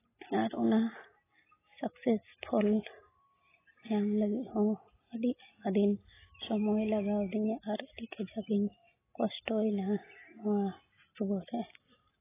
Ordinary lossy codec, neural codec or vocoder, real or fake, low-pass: AAC, 16 kbps; none; real; 3.6 kHz